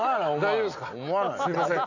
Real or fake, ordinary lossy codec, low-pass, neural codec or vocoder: real; none; 7.2 kHz; none